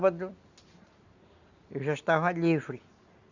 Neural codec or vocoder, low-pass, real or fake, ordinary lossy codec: none; 7.2 kHz; real; Opus, 64 kbps